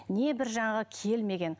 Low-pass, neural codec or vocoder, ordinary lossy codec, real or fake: none; none; none; real